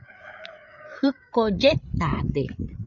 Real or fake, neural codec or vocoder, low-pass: fake; codec, 16 kHz, 8 kbps, FreqCodec, larger model; 7.2 kHz